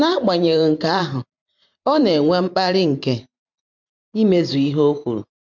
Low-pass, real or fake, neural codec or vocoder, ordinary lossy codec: 7.2 kHz; fake; vocoder, 44.1 kHz, 128 mel bands every 512 samples, BigVGAN v2; MP3, 64 kbps